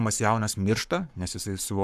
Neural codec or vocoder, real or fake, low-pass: codec, 44.1 kHz, 7.8 kbps, Pupu-Codec; fake; 14.4 kHz